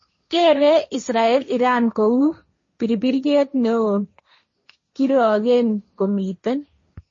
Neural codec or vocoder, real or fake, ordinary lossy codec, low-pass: codec, 16 kHz, 1.1 kbps, Voila-Tokenizer; fake; MP3, 32 kbps; 7.2 kHz